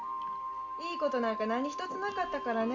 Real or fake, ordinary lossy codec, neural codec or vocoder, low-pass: real; none; none; 7.2 kHz